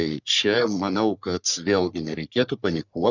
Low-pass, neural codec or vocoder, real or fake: 7.2 kHz; codec, 44.1 kHz, 3.4 kbps, Pupu-Codec; fake